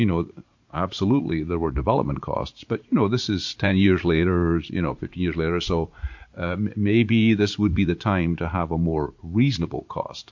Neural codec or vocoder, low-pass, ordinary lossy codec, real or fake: vocoder, 44.1 kHz, 80 mel bands, Vocos; 7.2 kHz; MP3, 48 kbps; fake